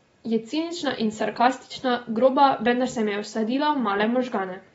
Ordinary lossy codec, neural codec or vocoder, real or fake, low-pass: AAC, 24 kbps; none; real; 19.8 kHz